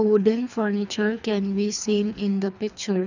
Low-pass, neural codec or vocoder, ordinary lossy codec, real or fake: 7.2 kHz; codec, 24 kHz, 3 kbps, HILCodec; none; fake